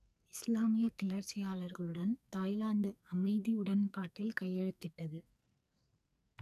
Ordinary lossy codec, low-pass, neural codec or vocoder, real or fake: none; 14.4 kHz; codec, 44.1 kHz, 2.6 kbps, SNAC; fake